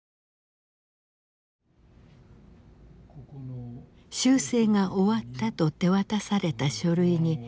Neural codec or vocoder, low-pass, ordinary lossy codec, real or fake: none; none; none; real